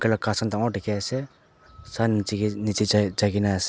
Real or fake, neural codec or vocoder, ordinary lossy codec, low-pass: real; none; none; none